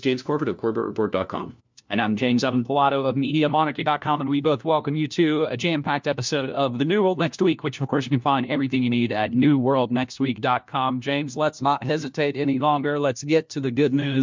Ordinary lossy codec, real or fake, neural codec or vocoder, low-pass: MP3, 64 kbps; fake; codec, 16 kHz, 1 kbps, FunCodec, trained on LibriTTS, 50 frames a second; 7.2 kHz